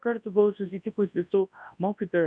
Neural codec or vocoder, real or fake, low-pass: codec, 24 kHz, 0.9 kbps, WavTokenizer, large speech release; fake; 9.9 kHz